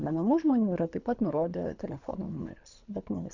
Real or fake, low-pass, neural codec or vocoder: fake; 7.2 kHz; codec, 24 kHz, 3 kbps, HILCodec